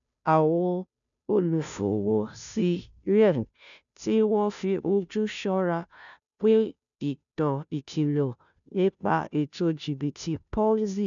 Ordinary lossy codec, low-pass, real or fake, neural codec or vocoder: none; 7.2 kHz; fake; codec, 16 kHz, 0.5 kbps, FunCodec, trained on Chinese and English, 25 frames a second